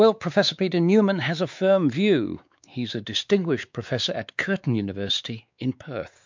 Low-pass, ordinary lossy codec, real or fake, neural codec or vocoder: 7.2 kHz; MP3, 64 kbps; fake; codec, 16 kHz, 4 kbps, X-Codec, WavLM features, trained on Multilingual LibriSpeech